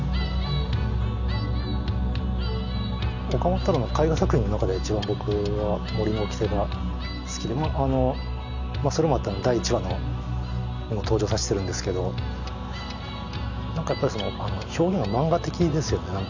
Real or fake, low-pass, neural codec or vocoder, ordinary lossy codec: real; 7.2 kHz; none; none